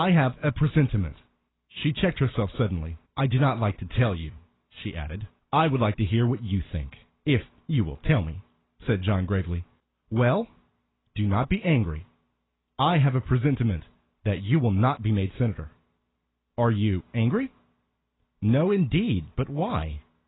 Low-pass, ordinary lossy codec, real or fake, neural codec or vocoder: 7.2 kHz; AAC, 16 kbps; real; none